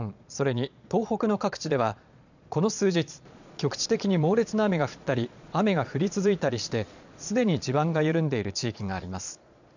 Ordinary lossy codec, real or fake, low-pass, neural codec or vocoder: none; fake; 7.2 kHz; vocoder, 22.05 kHz, 80 mel bands, Vocos